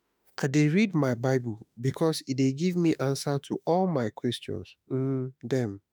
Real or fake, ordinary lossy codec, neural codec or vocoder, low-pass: fake; none; autoencoder, 48 kHz, 32 numbers a frame, DAC-VAE, trained on Japanese speech; none